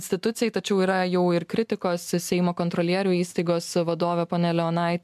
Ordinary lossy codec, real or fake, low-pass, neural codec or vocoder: MP3, 96 kbps; real; 14.4 kHz; none